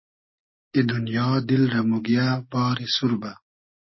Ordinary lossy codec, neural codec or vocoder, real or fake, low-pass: MP3, 24 kbps; none; real; 7.2 kHz